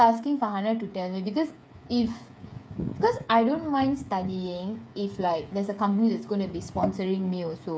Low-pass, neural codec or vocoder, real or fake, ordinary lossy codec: none; codec, 16 kHz, 8 kbps, FreqCodec, smaller model; fake; none